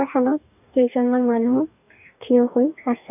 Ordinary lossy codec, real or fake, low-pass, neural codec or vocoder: none; fake; 3.6 kHz; codec, 44.1 kHz, 2.6 kbps, DAC